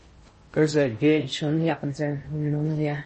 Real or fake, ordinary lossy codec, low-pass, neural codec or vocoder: fake; MP3, 32 kbps; 10.8 kHz; codec, 16 kHz in and 24 kHz out, 0.6 kbps, FocalCodec, streaming, 4096 codes